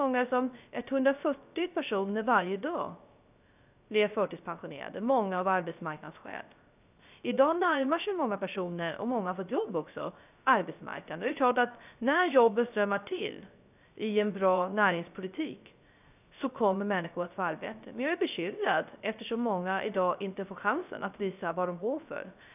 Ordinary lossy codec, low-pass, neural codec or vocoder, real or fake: none; 3.6 kHz; codec, 16 kHz, 0.3 kbps, FocalCodec; fake